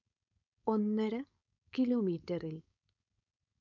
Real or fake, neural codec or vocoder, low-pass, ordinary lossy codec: fake; codec, 16 kHz, 4.8 kbps, FACodec; 7.2 kHz; none